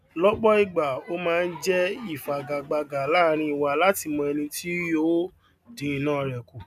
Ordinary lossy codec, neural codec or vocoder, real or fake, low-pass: none; none; real; 14.4 kHz